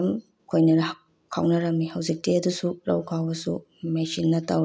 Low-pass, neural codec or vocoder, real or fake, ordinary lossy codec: none; none; real; none